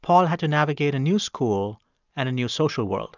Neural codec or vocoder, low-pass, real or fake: none; 7.2 kHz; real